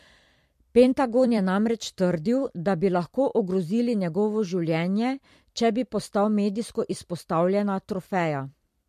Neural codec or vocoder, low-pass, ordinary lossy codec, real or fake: vocoder, 44.1 kHz, 128 mel bands every 256 samples, BigVGAN v2; 14.4 kHz; MP3, 64 kbps; fake